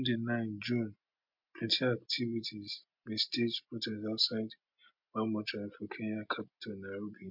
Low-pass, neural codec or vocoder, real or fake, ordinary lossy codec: 5.4 kHz; none; real; MP3, 48 kbps